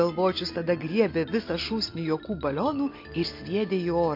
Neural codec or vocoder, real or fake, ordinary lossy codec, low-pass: none; real; MP3, 32 kbps; 5.4 kHz